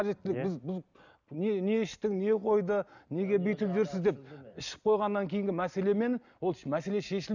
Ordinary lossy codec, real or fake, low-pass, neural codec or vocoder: none; real; 7.2 kHz; none